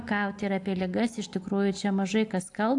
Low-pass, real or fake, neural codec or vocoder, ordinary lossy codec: 10.8 kHz; real; none; AAC, 64 kbps